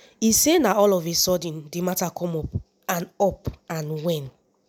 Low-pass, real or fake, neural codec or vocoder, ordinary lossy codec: none; real; none; none